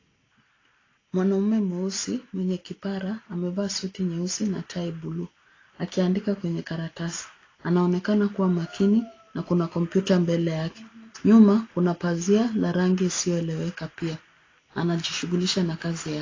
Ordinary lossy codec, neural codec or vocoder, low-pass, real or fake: AAC, 32 kbps; none; 7.2 kHz; real